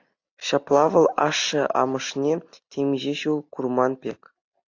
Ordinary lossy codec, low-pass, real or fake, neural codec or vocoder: AAC, 48 kbps; 7.2 kHz; real; none